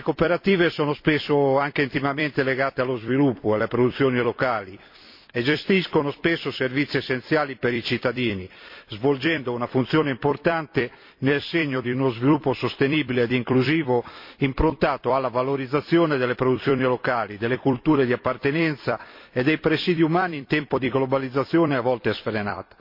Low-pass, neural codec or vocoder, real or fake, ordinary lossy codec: 5.4 kHz; vocoder, 44.1 kHz, 128 mel bands every 256 samples, BigVGAN v2; fake; MP3, 32 kbps